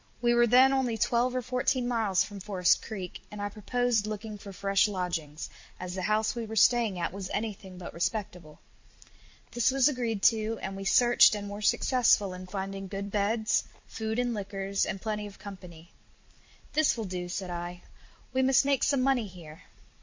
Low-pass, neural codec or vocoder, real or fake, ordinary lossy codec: 7.2 kHz; vocoder, 44.1 kHz, 128 mel bands every 256 samples, BigVGAN v2; fake; MP3, 48 kbps